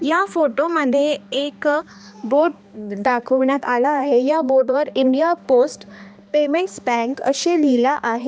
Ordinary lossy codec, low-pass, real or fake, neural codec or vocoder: none; none; fake; codec, 16 kHz, 2 kbps, X-Codec, HuBERT features, trained on balanced general audio